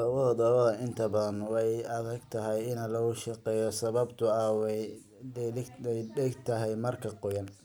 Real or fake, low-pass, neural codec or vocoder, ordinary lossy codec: real; none; none; none